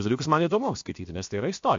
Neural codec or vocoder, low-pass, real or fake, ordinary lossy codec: codec, 16 kHz, about 1 kbps, DyCAST, with the encoder's durations; 7.2 kHz; fake; MP3, 48 kbps